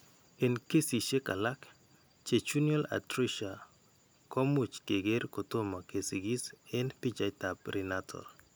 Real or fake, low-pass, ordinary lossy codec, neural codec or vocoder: real; none; none; none